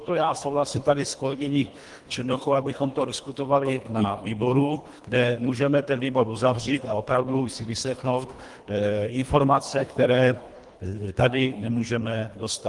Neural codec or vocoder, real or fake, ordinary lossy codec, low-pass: codec, 24 kHz, 1.5 kbps, HILCodec; fake; Opus, 24 kbps; 10.8 kHz